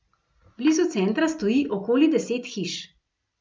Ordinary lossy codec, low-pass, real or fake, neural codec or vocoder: none; none; real; none